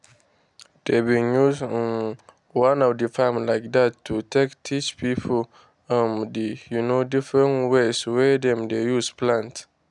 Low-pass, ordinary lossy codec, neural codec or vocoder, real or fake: 10.8 kHz; none; none; real